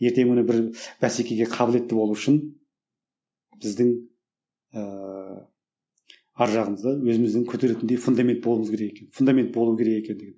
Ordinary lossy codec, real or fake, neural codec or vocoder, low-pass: none; real; none; none